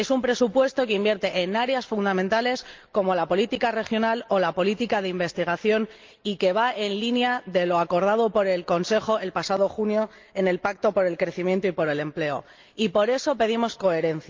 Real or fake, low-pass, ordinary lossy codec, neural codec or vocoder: real; 7.2 kHz; Opus, 24 kbps; none